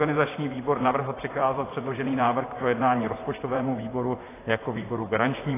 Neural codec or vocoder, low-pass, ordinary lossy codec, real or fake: vocoder, 44.1 kHz, 128 mel bands, Pupu-Vocoder; 3.6 kHz; AAC, 16 kbps; fake